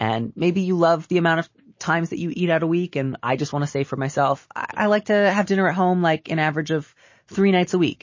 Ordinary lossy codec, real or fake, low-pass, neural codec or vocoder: MP3, 32 kbps; real; 7.2 kHz; none